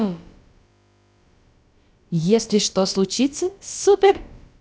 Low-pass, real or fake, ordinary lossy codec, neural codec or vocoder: none; fake; none; codec, 16 kHz, about 1 kbps, DyCAST, with the encoder's durations